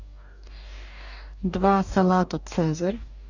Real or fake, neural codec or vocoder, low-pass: fake; codec, 44.1 kHz, 2.6 kbps, DAC; 7.2 kHz